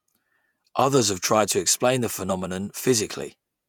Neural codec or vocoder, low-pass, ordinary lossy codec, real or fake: vocoder, 48 kHz, 128 mel bands, Vocos; none; none; fake